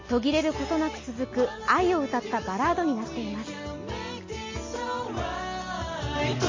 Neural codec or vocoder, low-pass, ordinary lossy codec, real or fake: none; 7.2 kHz; MP3, 32 kbps; real